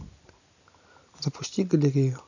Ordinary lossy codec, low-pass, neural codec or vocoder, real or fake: none; 7.2 kHz; none; real